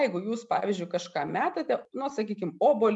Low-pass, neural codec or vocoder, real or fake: 10.8 kHz; none; real